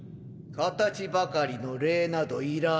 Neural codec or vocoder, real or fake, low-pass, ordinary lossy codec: none; real; none; none